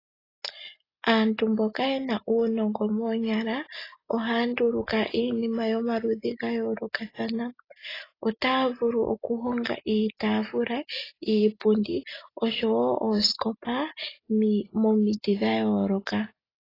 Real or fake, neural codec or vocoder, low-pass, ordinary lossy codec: real; none; 5.4 kHz; AAC, 24 kbps